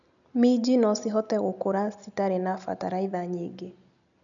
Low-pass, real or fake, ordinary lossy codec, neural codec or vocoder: 7.2 kHz; real; none; none